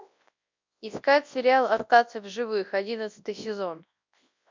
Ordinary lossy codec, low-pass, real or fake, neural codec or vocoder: MP3, 64 kbps; 7.2 kHz; fake; codec, 24 kHz, 0.9 kbps, WavTokenizer, large speech release